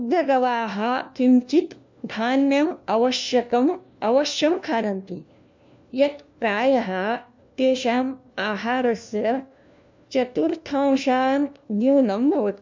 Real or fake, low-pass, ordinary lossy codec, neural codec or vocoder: fake; 7.2 kHz; MP3, 64 kbps; codec, 16 kHz, 1 kbps, FunCodec, trained on LibriTTS, 50 frames a second